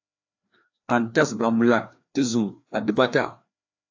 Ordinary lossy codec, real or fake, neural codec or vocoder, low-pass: AAC, 48 kbps; fake; codec, 16 kHz, 2 kbps, FreqCodec, larger model; 7.2 kHz